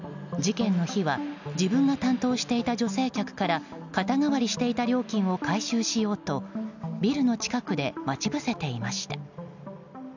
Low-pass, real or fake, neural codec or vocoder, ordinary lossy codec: 7.2 kHz; real; none; none